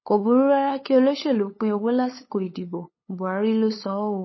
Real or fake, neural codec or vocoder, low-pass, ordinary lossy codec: fake; codec, 16 kHz, 8 kbps, FunCodec, trained on LibriTTS, 25 frames a second; 7.2 kHz; MP3, 24 kbps